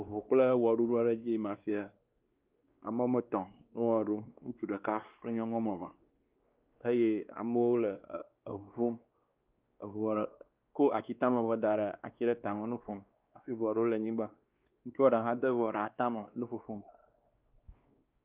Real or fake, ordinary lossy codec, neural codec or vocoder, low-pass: fake; Opus, 32 kbps; codec, 16 kHz, 2 kbps, X-Codec, WavLM features, trained on Multilingual LibriSpeech; 3.6 kHz